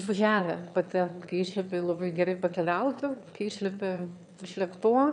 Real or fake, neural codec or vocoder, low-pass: fake; autoencoder, 22.05 kHz, a latent of 192 numbers a frame, VITS, trained on one speaker; 9.9 kHz